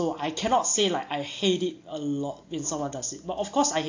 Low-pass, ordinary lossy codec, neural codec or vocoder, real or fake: 7.2 kHz; AAC, 48 kbps; none; real